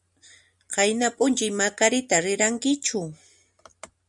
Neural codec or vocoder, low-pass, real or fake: none; 10.8 kHz; real